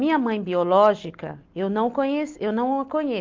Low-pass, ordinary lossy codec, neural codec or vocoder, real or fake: 7.2 kHz; Opus, 32 kbps; none; real